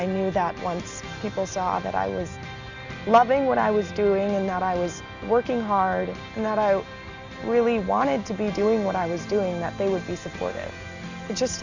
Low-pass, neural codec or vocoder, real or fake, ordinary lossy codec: 7.2 kHz; none; real; Opus, 64 kbps